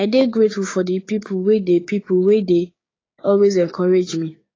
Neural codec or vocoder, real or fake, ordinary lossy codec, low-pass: codec, 16 kHz, 6 kbps, DAC; fake; AAC, 32 kbps; 7.2 kHz